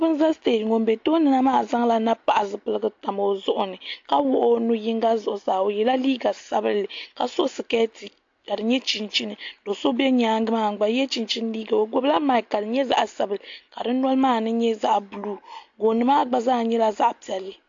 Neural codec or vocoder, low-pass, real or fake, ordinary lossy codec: none; 7.2 kHz; real; AAC, 48 kbps